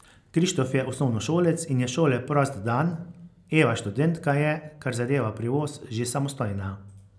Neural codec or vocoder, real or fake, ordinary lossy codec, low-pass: none; real; none; none